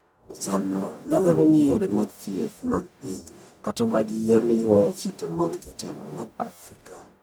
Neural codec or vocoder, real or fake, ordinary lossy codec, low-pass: codec, 44.1 kHz, 0.9 kbps, DAC; fake; none; none